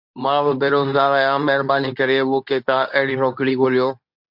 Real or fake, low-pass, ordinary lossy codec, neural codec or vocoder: fake; 5.4 kHz; MP3, 48 kbps; codec, 24 kHz, 0.9 kbps, WavTokenizer, medium speech release version 2